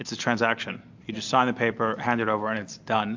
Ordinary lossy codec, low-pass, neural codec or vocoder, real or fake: AAC, 48 kbps; 7.2 kHz; none; real